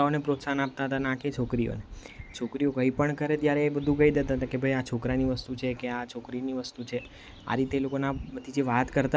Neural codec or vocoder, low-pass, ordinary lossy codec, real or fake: none; none; none; real